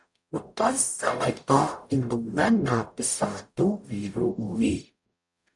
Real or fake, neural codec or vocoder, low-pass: fake; codec, 44.1 kHz, 0.9 kbps, DAC; 10.8 kHz